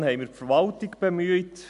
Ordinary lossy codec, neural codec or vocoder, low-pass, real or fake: MP3, 64 kbps; none; 10.8 kHz; real